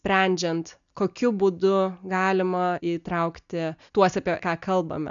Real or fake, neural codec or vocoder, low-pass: real; none; 7.2 kHz